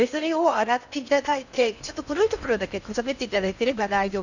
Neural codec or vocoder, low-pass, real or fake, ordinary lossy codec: codec, 16 kHz in and 24 kHz out, 0.6 kbps, FocalCodec, streaming, 4096 codes; 7.2 kHz; fake; none